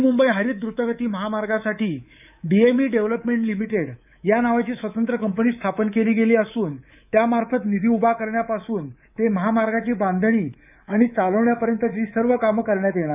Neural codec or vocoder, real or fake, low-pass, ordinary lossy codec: codec, 24 kHz, 3.1 kbps, DualCodec; fake; 3.6 kHz; none